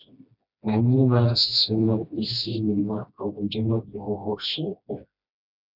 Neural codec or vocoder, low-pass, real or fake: codec, 16 kHz, 1 kbps, FreqCodec, smaller model; 5.4 kHz; fake